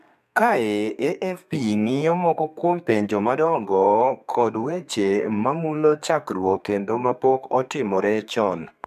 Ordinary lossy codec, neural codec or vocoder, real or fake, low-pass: AAC, 96 kbps; codec, 32 kHz, 1.9 kbps, SNAC; fake; 14.4 kHz